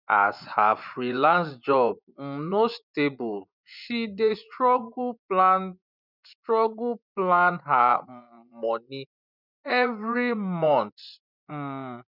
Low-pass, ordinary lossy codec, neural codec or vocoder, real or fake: 5.4 kHz; none; vocoder, 24 kHz, 100 mel bands, Vocos; fake